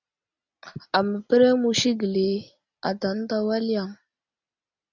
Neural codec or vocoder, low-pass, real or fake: none; 7.2 kHz; real